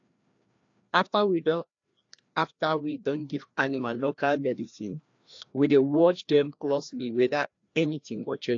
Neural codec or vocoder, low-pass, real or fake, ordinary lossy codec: codec, 16 kHz, 1 kbps, FreqCodec, larger model; 7.2 kHz; fake; AAC, 48 kbps